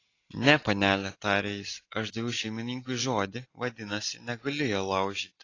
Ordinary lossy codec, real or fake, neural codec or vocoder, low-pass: AAC, 32 kbps; real; none; 7.2 kHz